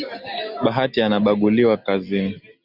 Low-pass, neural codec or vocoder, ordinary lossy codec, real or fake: 5.4 kHz; none; Opus, 64 kbps; real